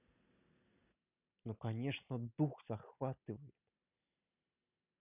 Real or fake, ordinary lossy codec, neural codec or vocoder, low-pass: real; none; none; 3.6 kHz